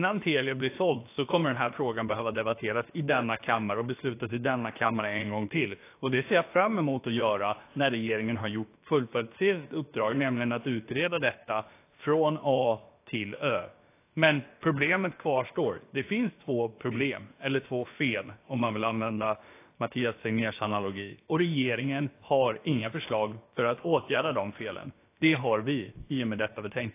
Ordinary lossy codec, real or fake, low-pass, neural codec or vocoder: AAC, 24 kbps; fake; 3.6 kHz; codec, 16 kHz, about 1 kbps, DyCAST, with the encoder's durations